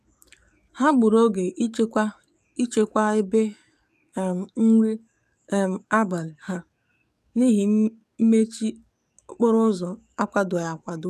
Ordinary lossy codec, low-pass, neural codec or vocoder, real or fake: none; 14.4 kHz; codec, 44.1 kHz, 7.8 kbps, DAC; fake